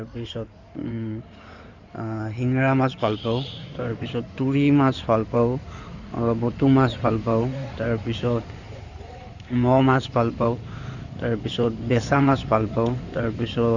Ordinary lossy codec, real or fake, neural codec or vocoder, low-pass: Opus, 64 kbps; fake; codec, 16 kHz in and 24 kHz out, 2.2 kbps, FireRedTTS-2 codec; 7.2 kHz